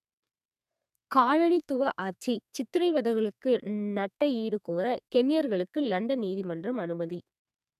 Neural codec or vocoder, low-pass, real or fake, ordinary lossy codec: codec, 32 kHz, 1.9 kbps, SNAC; 14.4 kHz; fake; none